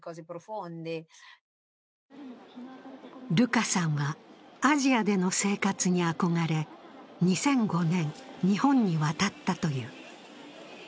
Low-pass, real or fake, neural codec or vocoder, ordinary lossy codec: none; real; none; none